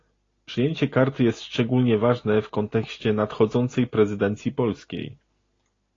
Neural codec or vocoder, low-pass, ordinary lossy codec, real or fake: none; 7.2 kHz; AAC, 32 kbps; real